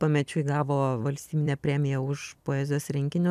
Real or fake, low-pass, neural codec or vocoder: fake; 14.4 kHz; vocoder, 44.1 kHz, 128 mel bands every 256 samples, BigVGAN v2